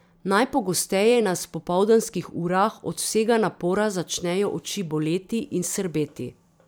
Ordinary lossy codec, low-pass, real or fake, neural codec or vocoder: none; none; fake; vocoder, 44.1 kHz, 128 mel bands every 256 samples, BigVGAN v2